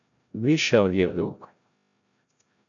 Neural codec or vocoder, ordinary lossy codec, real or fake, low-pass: codec, 16 kHz, 0.5 kbps, FreqCodec, larger model; AAC, 64 kbps; fake; 7.2 kHz